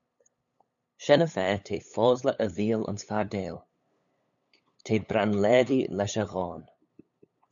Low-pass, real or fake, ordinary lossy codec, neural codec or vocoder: 7.2 kHz; fake; MP3, 96 kbps; codec, 16 kHz, 8 kbps, FunCodec, trained on LibriTTS, 25 frames a second